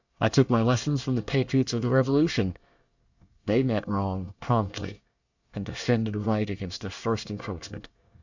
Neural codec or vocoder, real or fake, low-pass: codec, 24 kHz, 1 kbps, SNAC; fake; 7.2 kHz